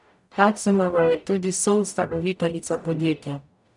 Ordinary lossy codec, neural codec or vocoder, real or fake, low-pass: none; codec, 44.1 kHz, 0.9 kbps, DAC; fake; 10.8 kHz